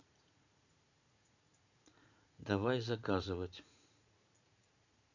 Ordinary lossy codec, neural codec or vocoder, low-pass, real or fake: none; vocoder, 44.1 kHz, 80 mel bands, Vocos; 7.2 kHz; fake